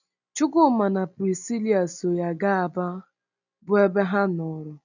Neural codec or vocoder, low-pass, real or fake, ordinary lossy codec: none; 7.2 kHz; real; none